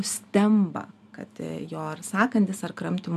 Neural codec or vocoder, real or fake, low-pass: none; real; 14.4 kHz